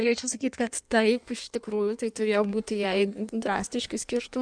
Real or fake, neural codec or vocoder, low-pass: fake; codec, 16 kHz in and 24 kHz out, 1.1 kbps, FireRedTTS-2 codec; 9.9 kHz